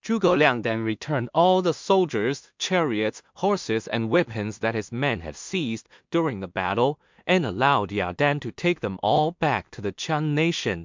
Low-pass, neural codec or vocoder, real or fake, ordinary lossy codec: 7.2 kHz; codec, 16 kHz in and 24 kHz out, 0.4 kbps, LongCat-Audio-Codec, two codebook decoder; fake; MP3, 64 kbps